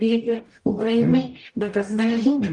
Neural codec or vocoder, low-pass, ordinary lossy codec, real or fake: codec, 44.1 kHz, 0.9 kbps, DAC; 10.8 kHz; Opus, 32 kbps; fake